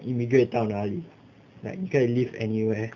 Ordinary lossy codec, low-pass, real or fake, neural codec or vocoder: none; 7.2 kHz; real; none